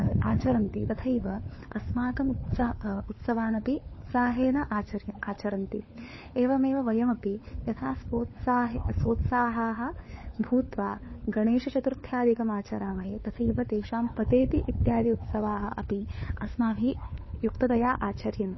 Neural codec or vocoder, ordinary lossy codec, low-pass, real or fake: codec, 16 kHz, 4 kbps, FunCodec, trained on LibriTTS, 50 frames a second; MP3, 24 kbps; 7.2 kHz; fake